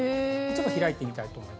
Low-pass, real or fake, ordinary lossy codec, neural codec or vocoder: none; real; none; none